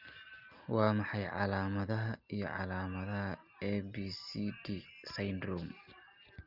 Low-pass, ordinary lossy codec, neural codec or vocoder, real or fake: 5.4 kHz; Opus, 24 kbps; none; real